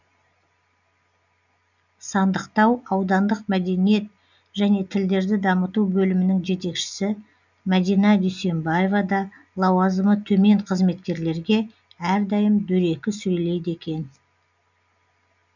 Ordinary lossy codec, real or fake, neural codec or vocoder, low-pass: none; real; none; 7.2 kHz